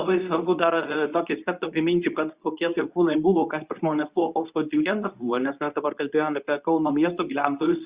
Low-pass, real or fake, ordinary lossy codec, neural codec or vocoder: 3.6 kHz; fake; Opus, 64 kbps; codec, 24 kHz, 0.9 kbps, WavTokenizer, medium speech release version 2